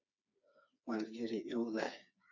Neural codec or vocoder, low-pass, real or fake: codec, 16 kHz, 4 kbps, FreqCodec, smaller model; 7.2 kHz; fake